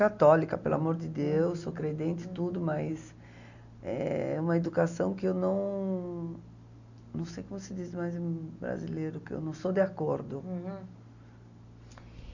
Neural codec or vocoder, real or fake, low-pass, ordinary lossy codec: none; real; 7.2 kHz; none